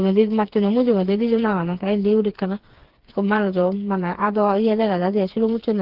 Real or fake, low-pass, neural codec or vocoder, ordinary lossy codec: fake; 5.4 kHz; codec, 16 kHz, 4 kbps, FreqCodec, smaller model; Opus, 16 kbps